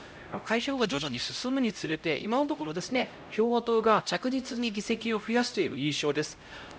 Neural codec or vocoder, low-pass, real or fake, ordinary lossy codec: codec, 16 kHz, 0.5 kbps, X-Codec, HuBERT features, trained on LibriSpeech; none; fake; none